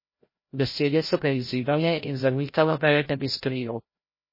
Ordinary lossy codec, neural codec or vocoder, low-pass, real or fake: MP3, 24 kbps; codec, 16 kHz, 0.5 kbps, FreqCodec, larger model; 5.4 kHz; fake